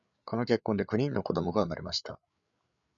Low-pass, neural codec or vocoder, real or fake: 7.2 kHz; codec, 16 kHz, 8 kbps, FreqCodec, larger model; fake